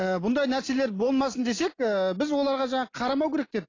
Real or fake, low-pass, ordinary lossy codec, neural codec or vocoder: real; 7.2 kHz; AAC, 32 kbps; none